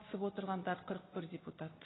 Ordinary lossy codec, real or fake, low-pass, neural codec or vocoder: AAC, 16 kbps; real; 7.2 kHz; none